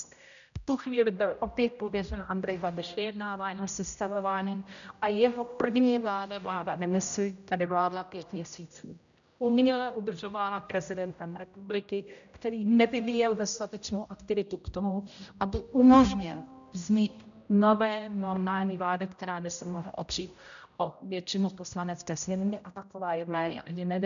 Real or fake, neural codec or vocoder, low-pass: fake; codec, 16 kHz, 0.5 kbps, X-Codec, HuBERT features, trained on general audio; 7.2 kHz